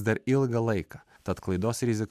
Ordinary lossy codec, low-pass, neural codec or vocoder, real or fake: MP3, 96 kbps; 14.4 kHz; none; real